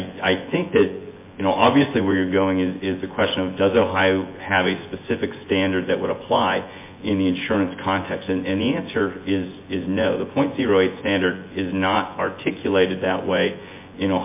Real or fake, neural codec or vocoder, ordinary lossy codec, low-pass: fake; vocoder, 24 kHz, 100 mel bands, Vocos; MP3, 24 kbps; 3.6 kHz